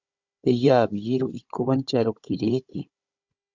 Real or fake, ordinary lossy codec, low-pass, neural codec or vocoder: fake; Opus, 64 kbps; 7.2 kHz; codec, 16 kHz, 16 kbps, FunCodec, trained on Chinese and English, 50 frames a second